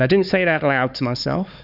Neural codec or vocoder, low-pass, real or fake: codec, 16 kHz, 4 kbps, X-Codec, HuBERT features, trained on LibriSpeech; 5.4 kHz; fake